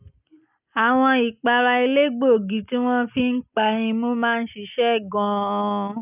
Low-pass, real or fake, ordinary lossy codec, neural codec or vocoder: 3.6 kHz; real; none; none